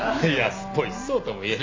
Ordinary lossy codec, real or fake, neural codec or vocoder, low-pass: MP3, 48 kbps; real; none; 7.2 kHz